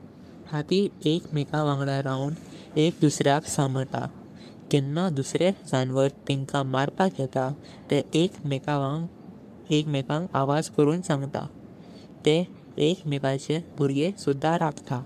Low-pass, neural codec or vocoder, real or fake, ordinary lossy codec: 14.4 kHz; codec, 44.1 kHz, 3.4 kbps, Pupu-Codec; fake; none